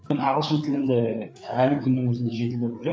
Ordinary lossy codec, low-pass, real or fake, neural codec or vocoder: none; none; fake; codec, 16 kHz, 4 kbps, FreqCodec, larger model